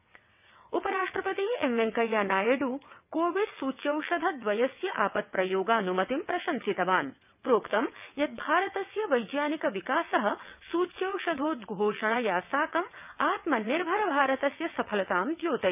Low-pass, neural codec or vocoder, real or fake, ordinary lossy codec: 3.6 kHz; vocoder, 22.05 kHz, 80 mel bands, WaveNeXt; fake; none